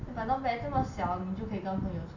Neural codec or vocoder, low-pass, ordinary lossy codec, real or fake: none; 7.2 kHz; none; real